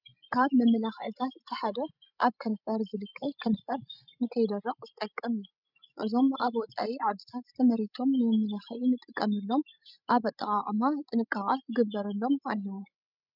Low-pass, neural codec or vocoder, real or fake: 5.4 kHz; codec, 16 kHz, 16 kbps, FreqCodec, larger model; fake